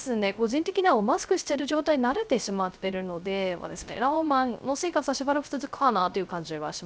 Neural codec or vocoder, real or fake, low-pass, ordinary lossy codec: codec, 16 kHz, 0.3 kbps, FocalCodec; fake; none; none